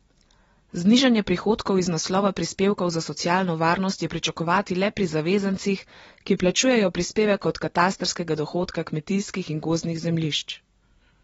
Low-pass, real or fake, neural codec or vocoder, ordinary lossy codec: 19.8 kHz; real; none; AAC, 24 kbps